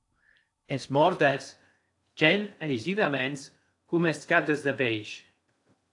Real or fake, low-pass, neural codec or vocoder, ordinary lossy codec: fake; 10.8 kHz; codec, 16 kHz in and 24 kHz out, 0.6 kbps, FocalCodec, streaming, 4096 codes; AAC, 64 kbps